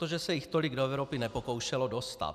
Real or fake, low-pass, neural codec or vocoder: real; 14.4 kHz; none